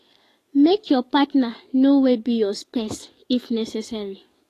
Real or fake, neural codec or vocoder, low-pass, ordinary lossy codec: fake; codec, 44.1 kHz, 7.8 kbps, DAC; 14.4 kHz; AAC, 48 kbps